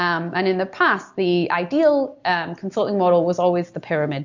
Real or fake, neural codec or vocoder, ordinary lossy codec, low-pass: real; none; MP3, 64 kbps; 7.2 kHz